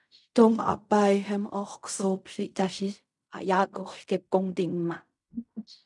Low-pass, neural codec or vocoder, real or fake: 10.8 kHz; codec, 16 kHz in and 24 kHz out, 0.4 kbps, LongCat-Audio-Codec, fine tuned four codebook decoder; fake